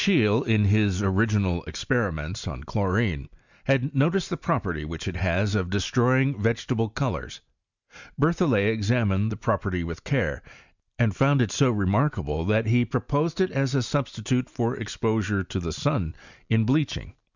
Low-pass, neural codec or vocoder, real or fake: 7.2 kHz; none; real